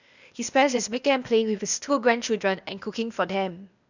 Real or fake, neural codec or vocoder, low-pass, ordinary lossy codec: fake; codec, 16 kHz, 0.8 kbps, ZipCodec; 7.2 kHz; none